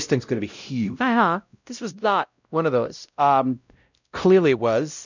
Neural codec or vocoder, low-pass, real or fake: codec, 16 kHz, 0.5 kbps, X-Codec, WavLM features, trained on Multilingual LibriSpeech; 7.2 kHz; fake